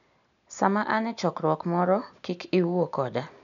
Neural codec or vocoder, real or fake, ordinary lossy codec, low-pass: none; real; MP3, 96 kbps; 7.2 kHz